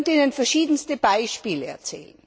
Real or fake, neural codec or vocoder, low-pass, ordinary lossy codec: real; none; none; none